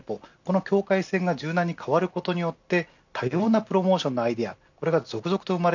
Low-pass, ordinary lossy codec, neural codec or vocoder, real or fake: 7.2 kHz; none; none; real